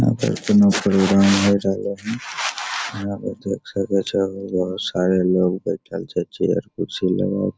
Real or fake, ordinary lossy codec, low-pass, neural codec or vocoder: real; none; none; none